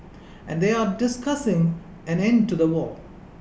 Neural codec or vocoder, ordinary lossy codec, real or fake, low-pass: none; none; real; none